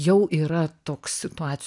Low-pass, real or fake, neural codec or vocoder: 10.8 kHz; real; none